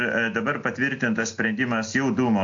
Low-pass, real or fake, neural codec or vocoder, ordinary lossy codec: 7.2 kHz; real; none; AAC, 64 kbps